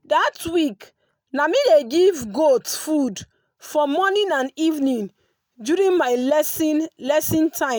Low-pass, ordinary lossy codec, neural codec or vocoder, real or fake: none; none; none; real